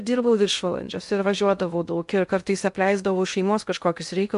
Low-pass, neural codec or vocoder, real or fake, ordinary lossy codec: 10.8 kHz; codec, 16 kHz in and 24 kHz out, 0.6 kbps, FocalCodec, streaming, 2048 codes; fake; MP3, 64 kbps